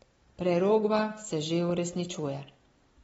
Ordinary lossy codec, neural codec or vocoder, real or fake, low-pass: AAC, 24 kbps; none; real; 19.8 kHz